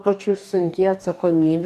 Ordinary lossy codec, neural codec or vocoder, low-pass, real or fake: AAC, 96 kbps; codec, 44.1 kHz, 2.6 kbps, DAC; 14.4 kHz; fake